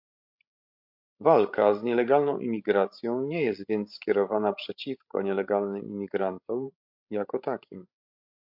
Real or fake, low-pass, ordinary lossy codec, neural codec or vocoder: fake; 5.4 kHz; MP3, 48 kbps; codec, 16 kHz, 16 kbps, FreqCodec, larger model